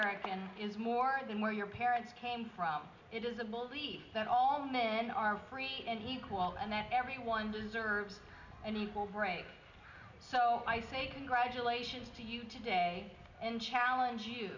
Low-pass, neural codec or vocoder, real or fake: 7.2 kHz; none; real